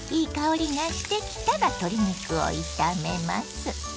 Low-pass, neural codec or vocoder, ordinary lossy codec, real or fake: none; none; none; real